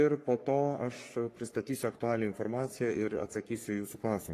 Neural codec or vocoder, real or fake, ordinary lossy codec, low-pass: codec, 44.1 kHz, 3.4 kbps, Pupu-Codec; fake; AAC, 48 kbps; 14.4 kHz